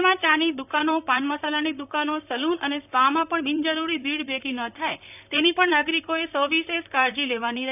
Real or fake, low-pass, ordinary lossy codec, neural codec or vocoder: fake; 3.6 kHz; none; vocoder, 44.1 kHz, 128 mel bands, Pupu-Vocoder